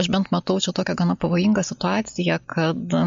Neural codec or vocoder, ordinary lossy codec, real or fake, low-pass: none; MP3, 48 kbps; real; 7.2 kHz